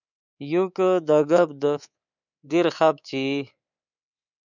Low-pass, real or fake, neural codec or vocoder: 7.2 kHz; fake; codec, 24 kHz, 3.1 kbps, DualCodec